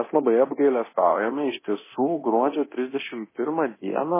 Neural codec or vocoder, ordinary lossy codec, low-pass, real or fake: codec, 24 kHz, 0.9 kbps, DualCodec; MP3, 16 kbps; 3.6 kHz; fake